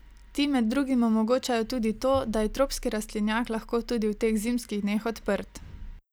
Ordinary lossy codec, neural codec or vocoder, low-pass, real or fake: none; none; none; real